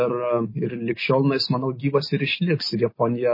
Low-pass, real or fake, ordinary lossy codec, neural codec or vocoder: 5.4 kHz; real; MP3, 24 kbps; none